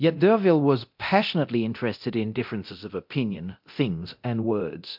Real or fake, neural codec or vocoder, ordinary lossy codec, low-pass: fake; codec, 24 kHz, 0.9 kbps, DualCodec; MP3, 48 kbps; 5.4 kHz